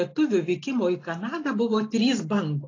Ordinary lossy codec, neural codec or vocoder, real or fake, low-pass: AAC, 32 kbps; none; real; 7.2 kHz